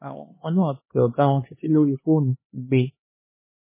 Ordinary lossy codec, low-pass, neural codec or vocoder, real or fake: MP3, 16 kbps; 3.6 kHz; codec, 16 kHz, 2 kbps, X-Codec, HuBERT features, trained on LibriSpeech; fake